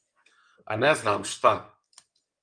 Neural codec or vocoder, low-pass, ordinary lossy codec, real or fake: codec, 16 kHz in and 24 kHz out, 2.2 kbps, FireRedTTS-2 codec; 9.9 kHz; Opus, 32 kbps; fake